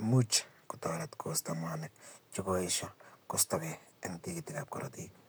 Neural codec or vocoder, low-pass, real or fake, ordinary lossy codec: vocoder, 44.1 kHz, 128 mel bands, Pupu-Vocoder; none; fake; none